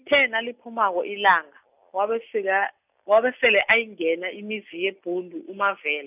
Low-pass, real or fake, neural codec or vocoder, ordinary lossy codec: 3.6 kHz; real; none; none